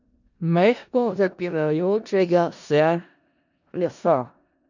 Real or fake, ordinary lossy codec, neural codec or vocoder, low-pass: fake; none; codec, 16 kHz in and 24 kHz out, 0.4 kbps, LongCat-Audio-Codec, four codebook decoder; 7.2 kHz